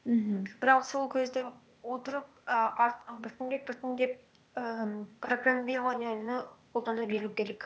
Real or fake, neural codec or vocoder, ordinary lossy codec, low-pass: fake; codec, 16 kHz, 0.8 kbps, ZipCodec; none; none